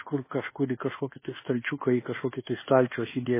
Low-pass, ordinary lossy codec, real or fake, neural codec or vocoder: 3.6 kHz; MP3, 16 kbps; fake; codec, 24 kHz, 1.2 kbps, DualCodec